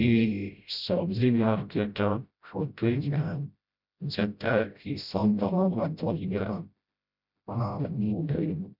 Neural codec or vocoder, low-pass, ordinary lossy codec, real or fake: codec, 16 kHz, 0.5 kbps, FreqCodec, smaller model; 5.4 kHz; none; fake